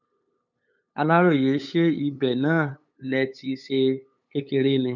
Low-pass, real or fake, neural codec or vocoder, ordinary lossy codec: 7.2 kHz; fake; codec, 16 kHz, 8 kbps, FunCodec, trained on LibriTTS, 25 frames a second; none